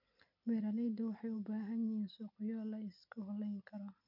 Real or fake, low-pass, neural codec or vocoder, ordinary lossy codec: real; 5.4 kHz; none; none